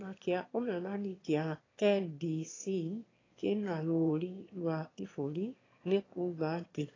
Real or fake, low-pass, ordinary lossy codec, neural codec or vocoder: fake; 7.2 kHz; AAC, 32 kbps; autoencoder, 22.05 kHz, a latent of 192 numbers a frame, VITS, trained on one speaker